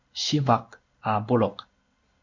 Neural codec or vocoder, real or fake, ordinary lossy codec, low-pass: codec, 16 kHz in and 24 kHz out, 1 kbps, XY-Tokenizer; fake; MP3, 64 kbps; 7.2 kHz